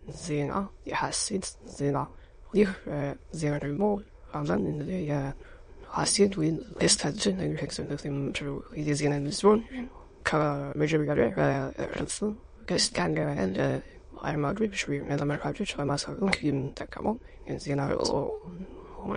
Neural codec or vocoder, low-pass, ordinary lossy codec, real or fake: autoencoder, 22.05 kHz, a latent of 192 numbers a frame, VITS, trained on many speakers; 9.9 kHz; MP3, 48 kbps; fake